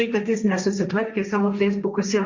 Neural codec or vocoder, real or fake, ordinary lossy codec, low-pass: codec, 16 kHz, 1.1 kbps, Voila-Tokenizer; fake; Opus, 64 kbps; 7.2 kHz